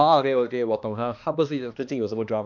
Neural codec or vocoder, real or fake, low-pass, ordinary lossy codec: codec, 16 kHz, 2 kbps, X-Codec, HuBERT features, trained on balanced general audio; fake; 7.2 kHz; none